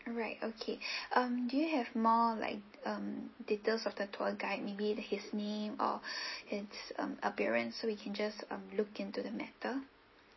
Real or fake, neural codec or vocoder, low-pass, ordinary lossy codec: real; none; 7.2 kHz; MP3, 24 kbps